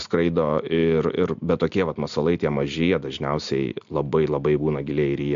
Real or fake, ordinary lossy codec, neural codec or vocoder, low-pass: real; MP3, 64 kbps; none; 7.2 kHz